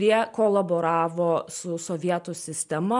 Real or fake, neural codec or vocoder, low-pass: real; none; 10.8 kHz